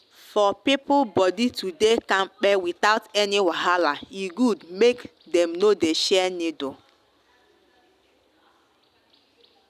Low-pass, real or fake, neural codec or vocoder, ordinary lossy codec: 14.4 kHz; real; none; none